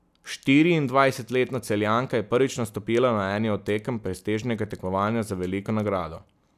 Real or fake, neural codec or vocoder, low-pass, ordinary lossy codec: real; none; 14.4 kHz; none